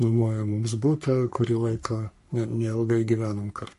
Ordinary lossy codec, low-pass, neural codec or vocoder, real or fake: MP3, 48 kbps; 14.4 kHz; codec, 44.1 kHz, 3.4 kbps, Pupu-Codec; fake